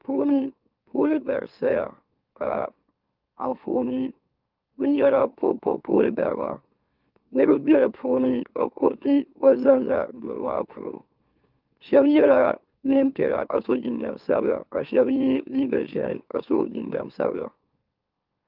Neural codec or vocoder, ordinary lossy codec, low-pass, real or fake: autoencoder, 44.1 kHz, a latent of 192 numbers a frame, MeloTTS; Opus, 32 kbps; 5.4 kHz; fake